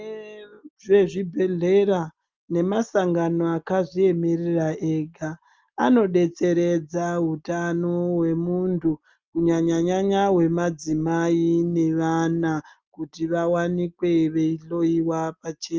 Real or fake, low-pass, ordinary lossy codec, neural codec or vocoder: real; 7.2 kHz; Opus, 24 kbps; none